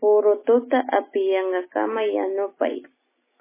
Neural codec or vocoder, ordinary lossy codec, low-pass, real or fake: none; MP3, 16 kbps; 3.6 kHz; real